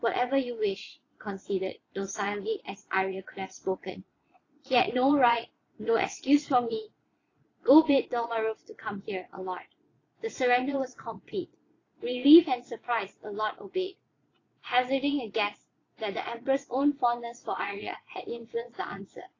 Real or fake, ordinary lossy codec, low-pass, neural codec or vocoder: fake; AAC, 32 kbps; 7.2 kHz; vocoder, 22.05 kHz, 80 mel bands, Vocos